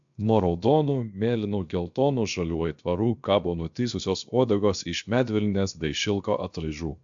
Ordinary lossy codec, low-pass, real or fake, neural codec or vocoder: AAC, 64 kbps; 7.2 kHz; fake; codec, 16 kHz, 0.7 kbps, FocalCodec